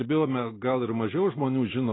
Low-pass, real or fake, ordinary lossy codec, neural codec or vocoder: 7.2 kHz; real; AAC, 16 kbps; none